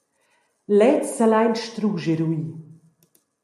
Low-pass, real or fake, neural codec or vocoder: 14.4 kHz; real; none